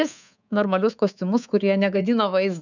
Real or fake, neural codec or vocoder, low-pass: fake; codec, 24 kHz, 3.1 kbps, DualCodec; 7.2 kHz